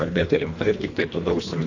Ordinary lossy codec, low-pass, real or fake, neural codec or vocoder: AAC, 48 kbps; 7.2 kHz; fake; codec, 24 kHz, 1.5 kbps, HILCodec